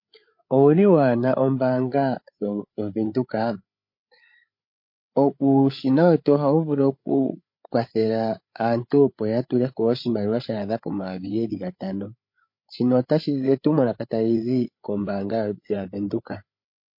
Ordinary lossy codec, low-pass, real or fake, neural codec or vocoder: MP3, 32 kbps; 5.4 kHz; fake; codec, 16 kHz, 8 kbps, FreqCodec, larger model